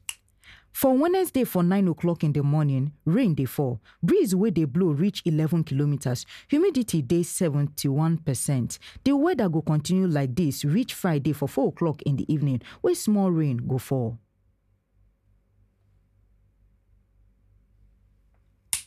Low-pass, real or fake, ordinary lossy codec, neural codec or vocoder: 14.4 kHz; real; none; none